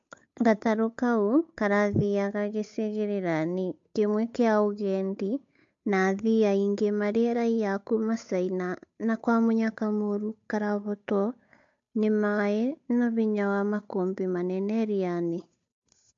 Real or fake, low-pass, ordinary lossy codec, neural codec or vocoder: fake; 7.2 kHz; MP3, 48 kbps; codec, 16 kHz, 8 kbps, FunCodec, trained on Chinese and English, 25 frames a second